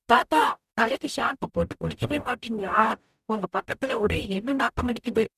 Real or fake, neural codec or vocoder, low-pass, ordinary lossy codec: fake; codec, 44.1 kHz, 0.9 kbps, DAC; 14.4 kHz; none